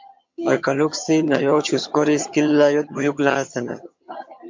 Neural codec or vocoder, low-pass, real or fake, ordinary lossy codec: vocoder, 22.05 kHz, 80 mel bands, HiFi-GAN; 7.2 kHz; fake; MP3, 48 kbps